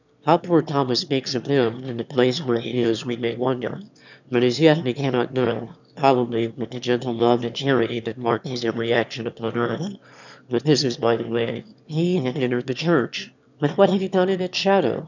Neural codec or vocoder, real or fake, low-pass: autoencoder, 22.05 kHz, a latent of 192 numbers a frame, VITS, trained on one speaker; fake; 7.2 kHz